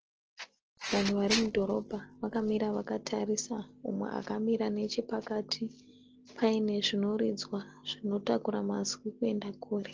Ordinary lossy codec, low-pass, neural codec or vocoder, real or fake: Opus, 16 kbps; 7.2 kHz; none; real